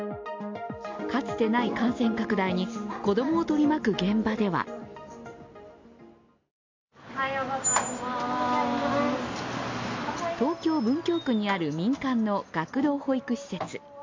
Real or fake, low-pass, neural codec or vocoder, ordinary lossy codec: real; 7.2 kHz; none; MP3, 48 kbps